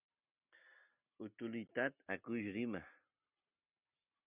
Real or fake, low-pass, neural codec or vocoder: real; 3.6 kHz; none